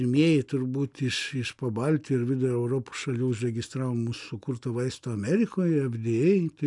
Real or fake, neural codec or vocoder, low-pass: real; none; 10.8 kHz